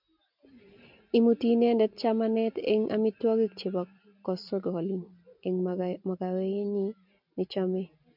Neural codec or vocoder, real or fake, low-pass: none; real; 5.4 kHz